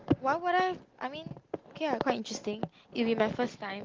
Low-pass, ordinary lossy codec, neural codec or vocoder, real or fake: 7.2 kHz; Opus, 16 kbps; none; real